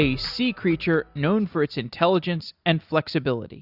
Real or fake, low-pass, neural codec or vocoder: real; 5.4 kHz; none